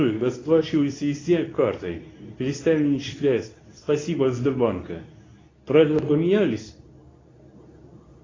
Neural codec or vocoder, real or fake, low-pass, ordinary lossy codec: codec, 24 kHz, 0.9 kbps, WavTokenizer, medium speech release version 2; fake; 7.2 kHz; AAC, 32 kbps